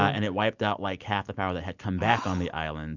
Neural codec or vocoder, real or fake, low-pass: none; real; 7.2 kHz